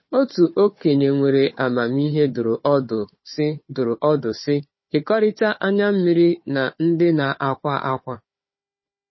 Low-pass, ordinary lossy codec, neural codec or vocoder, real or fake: 7.2 kHz; MP3, 24 kbps; codec, 16 kHz, 4 kbps, FunCodec, trained on Chinese and English, 50 frames a second; fake